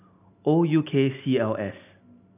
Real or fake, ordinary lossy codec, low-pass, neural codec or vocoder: real; none; 3.6 kHz; none